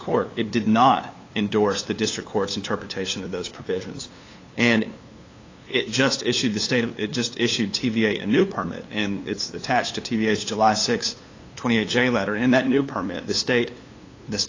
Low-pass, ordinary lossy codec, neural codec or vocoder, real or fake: 7.2 kHz; AAC, 32 kbps; codec, 16 kHz, 2 kbps, FunCodec, trained on LibriTTS, 25 frames a second; fake